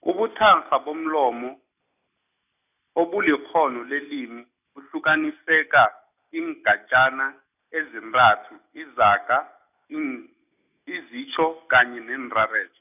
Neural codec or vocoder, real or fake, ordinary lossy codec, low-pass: none; real; none; 3.6 kHz